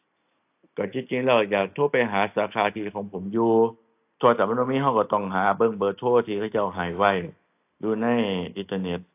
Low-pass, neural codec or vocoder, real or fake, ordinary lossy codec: 3.6 kHz; none; real; AAC, 32 kbps